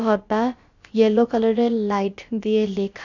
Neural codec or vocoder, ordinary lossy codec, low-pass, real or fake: codec, 16 kHz, about 1 kbps, DyCAST, with the encoder's durations; none; 7.2 kHz; fake